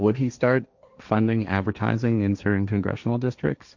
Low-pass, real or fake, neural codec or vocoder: 7.2 kHz; fake; codec, 16 kHz, 1.1 kbps, Voila-Tokenizer